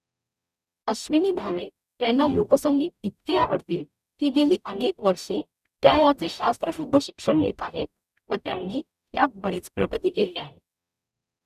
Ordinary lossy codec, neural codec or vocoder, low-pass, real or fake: none; codec, 44.1 kHz, 0.9 kbps, DAC; 14.4 kHz; fake